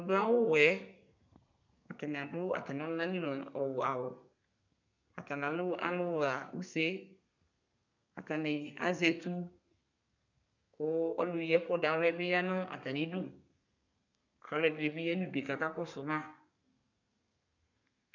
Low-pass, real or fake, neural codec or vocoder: 7.2 kHz; fake; codec, 32 kHz, 1.9 kbps, SNAC